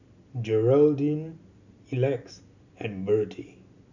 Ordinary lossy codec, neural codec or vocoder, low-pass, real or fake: none; none; 7.2 kHz; real